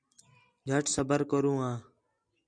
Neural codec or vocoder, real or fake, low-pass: none; real; 9.9 kHz